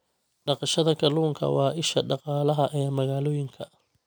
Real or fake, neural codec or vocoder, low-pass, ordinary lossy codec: real; none; none; none